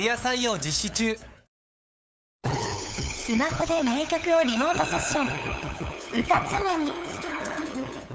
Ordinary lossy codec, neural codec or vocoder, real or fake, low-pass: none; codec, 16 kHz, 8 kbps, FunCodec, trained on LibriTTS, 25 frames a second; fake; none